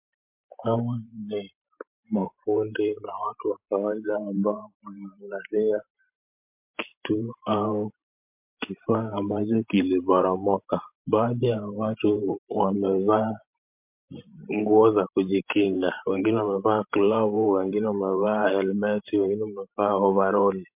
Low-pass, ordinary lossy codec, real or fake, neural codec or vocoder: 3.6 kHz; MP3, 32 kbps; fake; vocoder, 44.1 kHz, 128 mel bands every 512 samples, BigVGAN v2